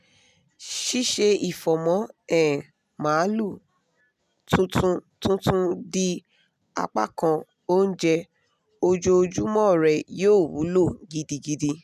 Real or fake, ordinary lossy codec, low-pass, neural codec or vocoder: real; none; 14.4 kHz; none